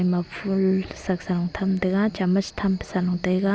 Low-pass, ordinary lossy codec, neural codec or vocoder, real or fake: none; none; none; real